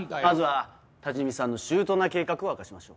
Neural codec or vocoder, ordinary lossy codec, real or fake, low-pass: none; none; real; none